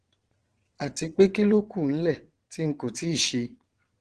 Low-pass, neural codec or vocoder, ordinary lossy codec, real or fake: 9.9 kHz; vocoder, 22.05 kHz, 80 mel bands, WaveNeXt; Opus, 16 kbps; fake